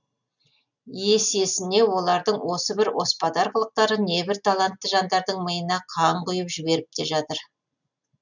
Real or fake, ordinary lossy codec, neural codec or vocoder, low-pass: real; none; none; 7.2 kHz